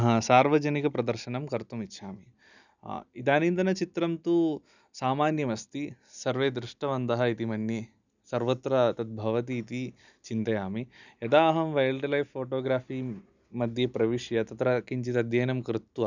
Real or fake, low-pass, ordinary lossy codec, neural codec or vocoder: real; 7.2 kHz; none; none